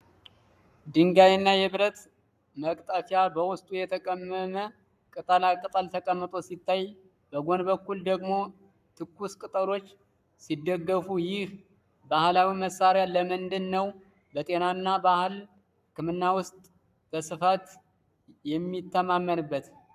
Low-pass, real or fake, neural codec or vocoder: 14.4 kHz; fake; codec, 44.1 kHz, 7.8 kbps, Pupu-Codec